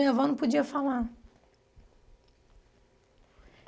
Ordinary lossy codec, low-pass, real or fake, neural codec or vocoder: none; none; real; none